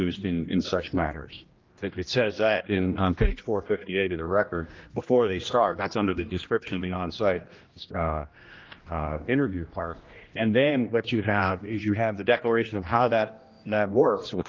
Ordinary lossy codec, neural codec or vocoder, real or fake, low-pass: Opus, 32 kbps; codec, 16 kHz, 1 kbps, X-Codec, HuBERT features, trained on general audio; fake; 7.2 kHz